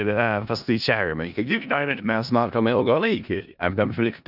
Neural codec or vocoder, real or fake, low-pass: codec, 16 kHz in and 24 kHz out, 0.4 kbps, LongCat-Audio-Codec, four codebook decoder; fake; 5.4 kHz